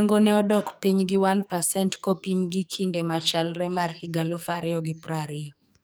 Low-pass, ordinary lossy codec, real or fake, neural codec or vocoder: none; none; fake; codec, 44.1 kHz, 2.6 kbps, SNAC